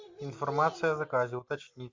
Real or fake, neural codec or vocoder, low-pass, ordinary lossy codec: real; none; 7.2 kHz; MP3, 48 kbps